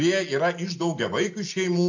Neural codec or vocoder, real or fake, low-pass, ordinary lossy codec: none; real; 7.2 kHz; MP3, 48 kbps